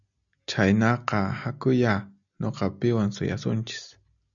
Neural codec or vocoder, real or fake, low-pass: none; real; 7.2 kHz